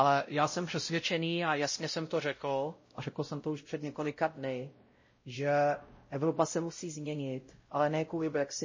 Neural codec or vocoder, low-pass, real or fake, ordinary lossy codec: codec, 16 kHz, 0.5 kbps, X-Codec, WavLM features, trained on Multilingual LibriSpeech; 7.2 kHz; fake; MP3, 32 kbps